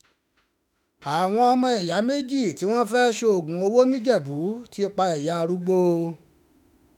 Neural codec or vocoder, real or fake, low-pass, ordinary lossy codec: autoencoder, 48 kHz, 32 numbers a frame, DAC-VAE, trained on Japanese speech; fake; 19.8 kHz; none